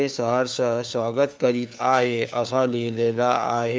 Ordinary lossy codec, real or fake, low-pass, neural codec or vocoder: none; fake; none; codec, 16 kHz, 4 kbps, FunCodec, trained on LibriTTS, 50 frames a second